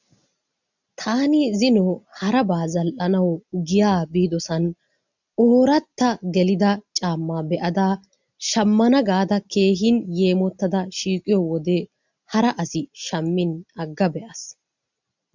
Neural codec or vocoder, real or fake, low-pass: none; real; 7.2 kHz